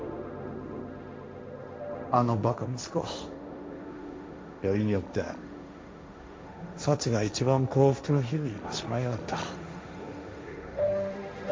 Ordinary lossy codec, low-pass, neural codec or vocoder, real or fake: none; none; codec, 16 kHz, 1.1 kbps, Voila-Tokenizer; fake